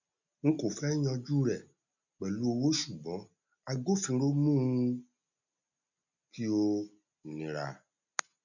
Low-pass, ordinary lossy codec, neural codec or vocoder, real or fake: 7.2 kHz; none; none; real